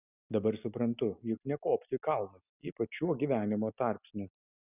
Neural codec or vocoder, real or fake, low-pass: none; real; 3.6 kHz